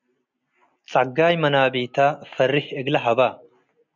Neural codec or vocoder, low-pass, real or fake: none; 7.2 kHz; real